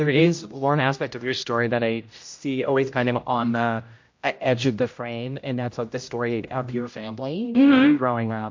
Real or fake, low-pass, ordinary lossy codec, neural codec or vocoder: fake; 7.2 kHz; MP3, 48 kbps; codec, 16 kHz, 0.5 kbps, X-Codec, HuBERT features, trained on general audio